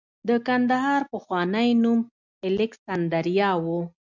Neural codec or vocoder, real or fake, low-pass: none; real; 7.2 kHz